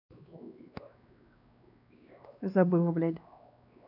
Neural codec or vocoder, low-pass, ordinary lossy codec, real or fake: codec, 16 kHz, 2 kbps, X-Codec, WavLM features, trained on Multilingual LibriSpeech; 5.4 kHz; none; fake